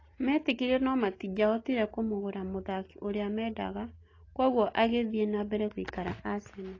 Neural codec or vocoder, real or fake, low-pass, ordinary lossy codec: none; real; 7.2 kHz; AAC, 32 kbps